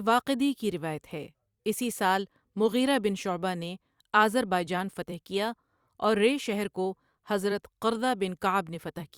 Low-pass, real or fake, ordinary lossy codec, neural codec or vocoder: 19.8 kHz; fake; Opus, 64 kbps; vocoder, 44.1 kHz, 128 mel bands every 256 samples, BigVGAN v2